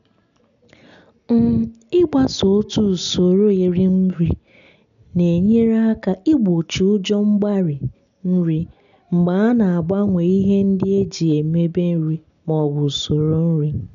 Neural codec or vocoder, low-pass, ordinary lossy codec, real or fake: none; 7.2 kHz; none; real